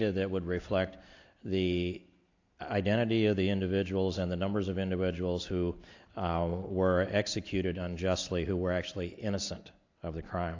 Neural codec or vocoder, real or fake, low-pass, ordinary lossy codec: none; real; 7.2 kHz; AAC, 48 kbps